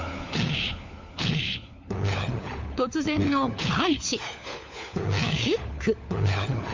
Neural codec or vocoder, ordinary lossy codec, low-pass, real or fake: codec, 16 kHz, 4 kbps, FunCodec, trained on LibriTTS, 50 frames a second; MP3, 64 kbps; 7.2 kHz; fake